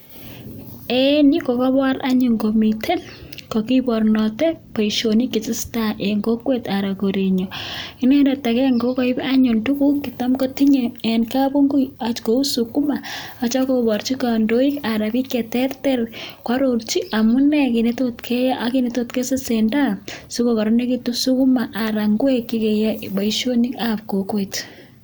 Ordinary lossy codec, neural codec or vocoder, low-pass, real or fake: none; none; none; real